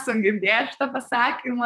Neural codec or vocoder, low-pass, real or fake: vocoder, 44.1 kHz, 128 mel bands, Pupu-Vocoder; 14.4 kHz; fake